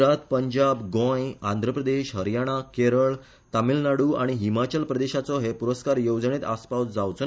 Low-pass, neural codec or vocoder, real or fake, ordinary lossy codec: none; none; real; none